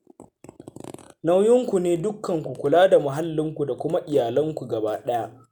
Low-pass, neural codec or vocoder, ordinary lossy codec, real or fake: none; none; none; real